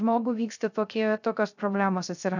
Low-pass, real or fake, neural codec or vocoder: 7.2 kHz; fake; codec, 16 kHz, 0.3 kbps, FocalCodec